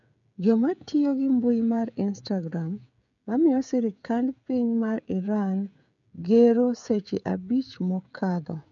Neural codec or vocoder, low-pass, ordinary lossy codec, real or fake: codec, 16 kHz, 16 kbps, FreqCodec, smaller model; 7.2 kHz; none; fake